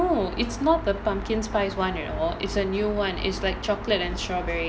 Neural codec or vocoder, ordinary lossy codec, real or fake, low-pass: none; none; real; none